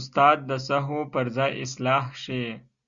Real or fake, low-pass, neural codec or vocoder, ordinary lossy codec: real; 7.2 kHz; none; Opus, 64 kbps